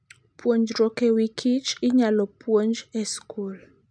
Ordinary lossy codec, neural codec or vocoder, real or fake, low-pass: none; none; real; 9.9 kHz